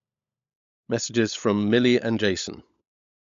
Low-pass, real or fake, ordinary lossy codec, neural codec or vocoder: 7.2 kHz; fake; AAC, 96 kbps; codec, 16 kHz, 16 kbps, FunCodec, trained on LibriTTS, 50 frames a second